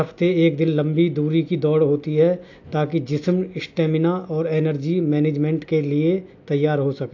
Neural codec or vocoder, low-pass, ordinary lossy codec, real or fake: none; 7.2 kHz; AAC, 48 kbps; real